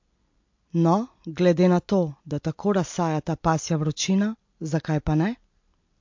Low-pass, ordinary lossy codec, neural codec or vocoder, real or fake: 7.2 kHz; MP3, 48 kbps; none; real